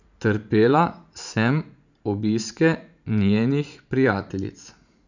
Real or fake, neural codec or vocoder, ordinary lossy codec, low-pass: fake; vocoder, 44.1 kHz, 80 mel bands, Vocos; none; 7.2 kHz